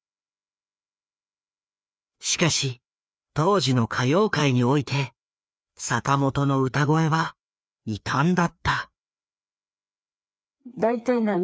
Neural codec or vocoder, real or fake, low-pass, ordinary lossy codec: codec, 16 kHz, 2 kbps, FreqCodec, larger model; fake; none; none